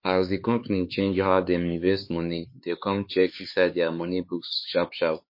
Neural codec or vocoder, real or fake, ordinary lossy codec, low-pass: codec, 16 kHz, 4 kbps, X-Codec, HuBERT features, trained on LibriSpeech; fake; MP3, 32 kbps; 5.4 kHz